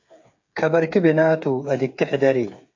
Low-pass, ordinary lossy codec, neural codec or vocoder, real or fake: 7.2 kHz; AAC, 48 kbps; codec, 16 kHz, 8 kbps, FreqCodec, smaller model; fake